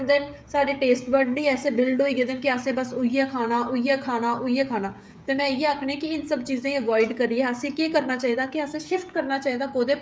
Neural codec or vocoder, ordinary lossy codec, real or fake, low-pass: codec, 16 kHz, 16 kbps, FreqCodec, smaller model; none; fake; none